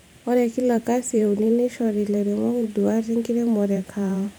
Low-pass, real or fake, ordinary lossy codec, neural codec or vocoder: none; fake; none; vocoder, 44.1 kHz, 128 mel bands every 512 samples, BigVGAN v2